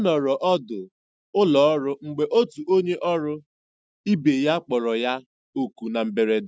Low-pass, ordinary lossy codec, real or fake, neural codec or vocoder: none; none; real; none